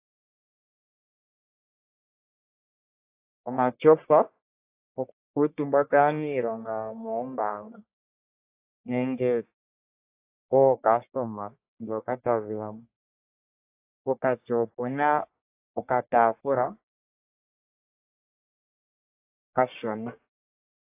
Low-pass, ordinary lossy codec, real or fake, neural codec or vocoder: 3.6 kHz; AAC, 24 kbps; fake; codec, 44.1 kHz, 1.7 kbps, Pupu-Codec